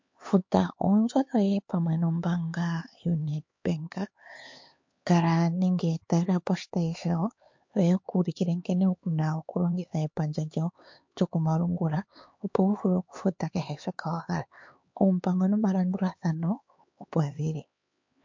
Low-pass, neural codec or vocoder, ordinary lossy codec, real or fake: 7.2 kHz; codec, 16 kHz, 4 kbps, X-Codec, HuBERT features, trained on LibriSpeech; MP3, 48 kbps; fake